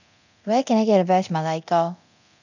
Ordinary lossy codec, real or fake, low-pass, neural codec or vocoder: none; fake; 7.2 kHz; codec, 24 kHz, 0.9 kbps, DualCodec